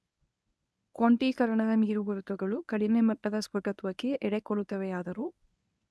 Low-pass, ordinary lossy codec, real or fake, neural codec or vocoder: none; none; fake; codec, 24 kHz, 0.9 kbps, WavTokenizer, medium speech release version 1